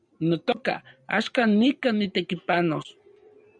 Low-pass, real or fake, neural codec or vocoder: 9.9 kHz; fake; vocoder, 44.1 kHz, 128 mel bands every 256 samples, BigVGAN v2